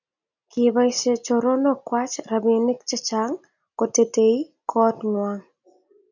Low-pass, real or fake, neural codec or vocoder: 7.2 kHz; real; none